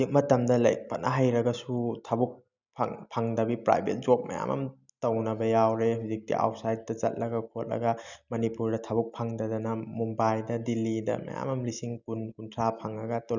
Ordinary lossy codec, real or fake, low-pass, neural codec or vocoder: none; real; 7.2 kHz; none